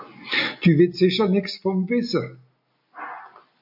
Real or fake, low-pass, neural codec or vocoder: real; 5.4 kHz; none